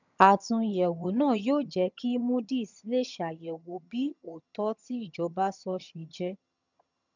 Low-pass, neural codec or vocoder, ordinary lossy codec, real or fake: 7.2 kHz; vocoder, 22.05 kHz, 80 mel bands, HiFi-GAN; none; fake